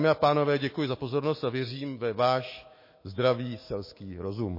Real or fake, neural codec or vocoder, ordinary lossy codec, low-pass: fake; autoencoder, 48 kHz, 128 numbers a frame, DAC-VAE, trained on Japanese speech; MP3, 24 kbps; 5.4 kHz